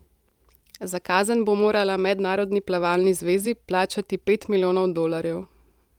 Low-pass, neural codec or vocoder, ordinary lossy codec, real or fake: 19.8 kHz; vocoder, 44.1 kHz, 128 mel bands every 512 samples, BigVGAN v2; Opus, 32 kbps; fake